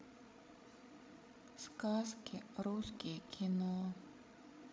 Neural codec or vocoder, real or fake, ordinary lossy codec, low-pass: codec, 16 kHz, 16 kbps, FreqCodec, larger model; fake; none; none